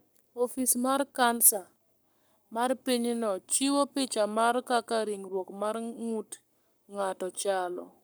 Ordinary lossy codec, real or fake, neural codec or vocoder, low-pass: none; fake; codec, 44.1 kHz, 7.8 kbps, Pupu-Codec; none